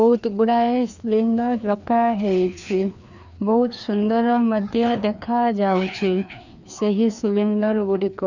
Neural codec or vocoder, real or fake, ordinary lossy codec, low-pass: codec, 16 kHz, 2 kbps, FreqCodec, larger model; fake; none; 7.2 kHz